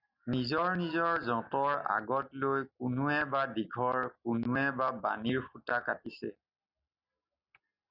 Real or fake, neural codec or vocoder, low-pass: real; none; 5.4 kHz